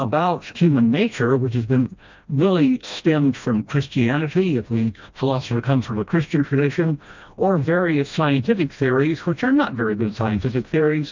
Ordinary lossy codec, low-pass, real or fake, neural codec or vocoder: MP3, 64 kbps; 7.2 kHz; fake; codec, 16 kHz, 1 kbps, FreqCodec, smaller model